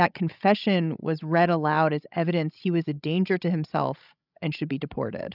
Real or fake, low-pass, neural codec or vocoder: fake; 5.4 kHz; codec, 16 kHz, 16 kbps, FreqCodec, larger model